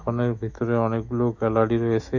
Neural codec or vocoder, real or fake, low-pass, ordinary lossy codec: autoencoder, 48 kHz, 128 numbers a frame, DAC-VAE, trained on Japanese speech; fake; 7.2 kHz; none